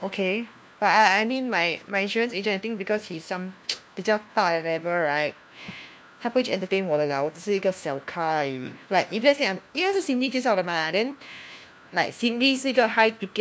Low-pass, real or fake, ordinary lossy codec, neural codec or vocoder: none; fake; none; codec, 16 kHz, 1 kbps, FunCodec, trained on LibriTTS, 50 frames a second